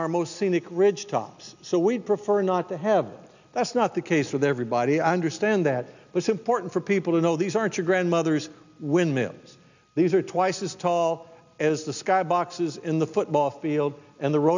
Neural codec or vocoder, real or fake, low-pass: none; real; 7.2 kHz